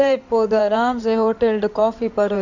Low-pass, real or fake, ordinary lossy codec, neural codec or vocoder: 7.2 kHz; fake; none; codec, 16 kHz in and 24 kHz out, 2.2 kbps, FireRedTTS-2 codec